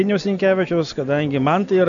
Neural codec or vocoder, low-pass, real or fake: none; 7.2 kHz; real